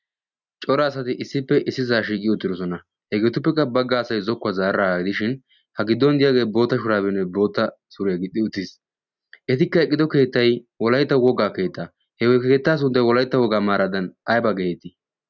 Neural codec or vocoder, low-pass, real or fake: none; 7.2 kHz; real